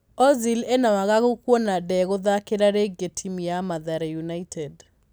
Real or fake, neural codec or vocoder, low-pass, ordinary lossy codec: real; none; none; none